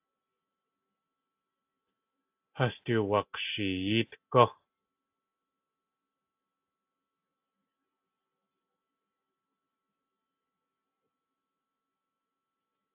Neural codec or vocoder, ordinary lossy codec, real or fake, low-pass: none; AAC, 32 kbps; real; 3.6 kHz